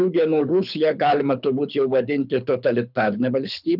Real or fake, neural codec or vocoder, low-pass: fake; codec, 24 kHz, 6 kbps, HILCodec; 5.4 kHz